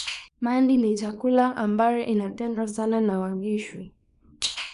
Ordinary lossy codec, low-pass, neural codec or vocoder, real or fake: MP3, 96 kbps; 10.8 kHz; codec, 24 kHz, 0.9 kbps, WavTokenizer, small release; fake